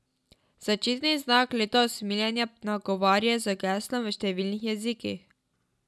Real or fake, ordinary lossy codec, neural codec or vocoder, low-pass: real; none; none; none